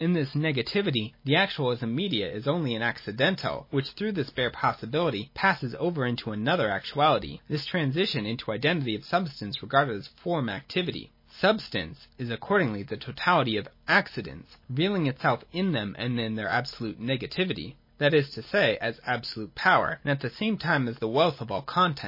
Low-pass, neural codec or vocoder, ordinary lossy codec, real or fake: 5.4 kHz; none; MP3, 24 kbps; real